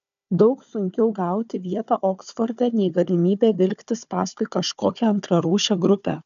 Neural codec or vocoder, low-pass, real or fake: codec, 16 kHz, 4 kbps, FunCodec, trained on Chinese and English, 50 frames a second; 7.2 kHz; fake